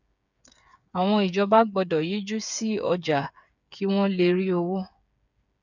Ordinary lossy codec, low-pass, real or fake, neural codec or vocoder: none; 7.2 kHz; fake; codec, 16 kHz, 8 kbps, FreqCodec, smaller model